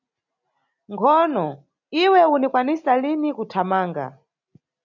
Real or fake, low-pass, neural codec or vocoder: real; 7.2 kHz; none